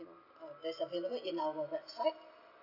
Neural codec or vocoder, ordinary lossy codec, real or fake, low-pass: none; none; real; 5.4 kHz